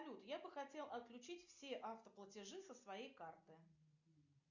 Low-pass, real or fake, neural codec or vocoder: 7.2 kHz; real; none